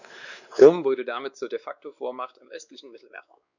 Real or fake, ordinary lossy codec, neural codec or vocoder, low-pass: fake; none; codec, 16 kHz, 2 kbps, X-Codec, WavLM features, trained on Multilingual LibriSpeech; 7.2 kHz